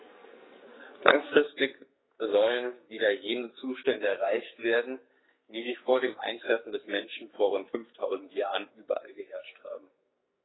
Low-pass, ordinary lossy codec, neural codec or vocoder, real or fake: 7.2 kHz; AAC, 16 kbps; codec, 44.1 kHz, 2.6 kbps, SNAC; fake